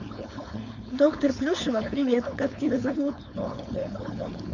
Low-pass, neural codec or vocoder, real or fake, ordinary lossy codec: 7.2 kHz; codec, 16 kHz, 4.8 kbps, FACodec; fake; none